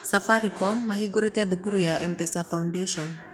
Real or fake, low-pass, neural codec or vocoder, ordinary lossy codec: fake; 19.8 kHz; codec, 44.1 kHz, 2.6 kbps, DAC; none